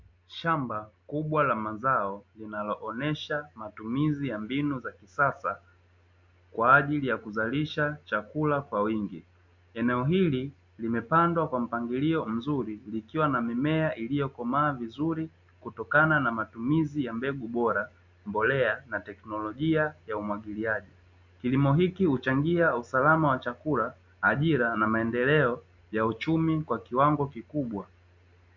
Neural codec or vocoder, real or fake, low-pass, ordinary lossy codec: none; real; 7.2 kHz; MP3, 64 kbps